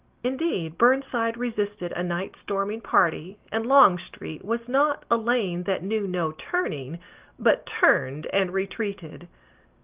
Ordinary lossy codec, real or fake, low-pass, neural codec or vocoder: Opus, 32 kbps; real; 3.6 kHz; none